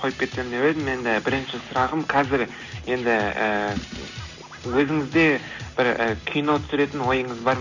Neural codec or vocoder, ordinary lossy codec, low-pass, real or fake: none; none; 7.2 kHz; real